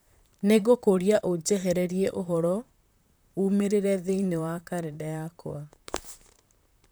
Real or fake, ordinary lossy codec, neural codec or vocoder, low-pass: fake; none; vocoder, 44.1 kHz, 128 mel bands, Pupu-Vocoder; none